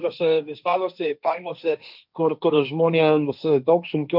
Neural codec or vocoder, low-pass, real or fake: codec, 16 kHz, 1.1 kbps, Voila-Tokenizer; 5.4 kHz; fake